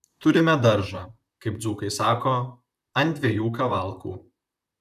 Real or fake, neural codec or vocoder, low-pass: fake; vocoder, 44.1 kHz, 128 mel bands, Pupu-Vocoder; 14.4 kHz